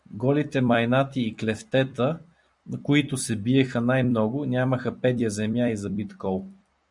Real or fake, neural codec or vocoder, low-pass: fake; vocoder, 44.1 kHz, 128 mel bands every 256 samples, BigVGAN v2; 10.8 kHz